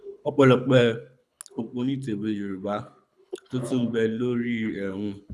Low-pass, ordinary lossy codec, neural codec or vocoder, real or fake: none; none; codec, 24 kHz, 6 kbps, HILCodec; fake